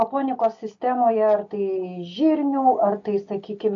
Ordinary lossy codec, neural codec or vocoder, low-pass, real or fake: AAC, 48 kbps; none; 7.2 kHz; real